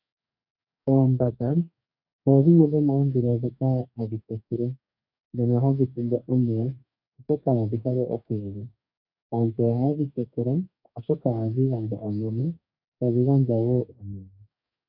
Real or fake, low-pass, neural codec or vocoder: fake; 5.4 kHz; codec, 44.1 kHz, 2.6 kbps, DAC